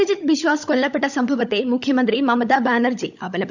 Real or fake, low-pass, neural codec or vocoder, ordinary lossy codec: fake; 7.2 kHz; codec, 16 kHz, 16 kbps, FunCodec, trained on LibriTTS, 50 frames a second; none